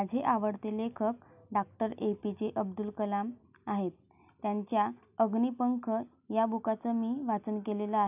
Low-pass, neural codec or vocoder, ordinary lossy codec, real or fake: 3.6 kHz; none; none; real